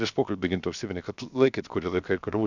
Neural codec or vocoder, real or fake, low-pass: codec, 16 kHz, 0.7 kbps, FocalCodec; fake; 7.2 kHz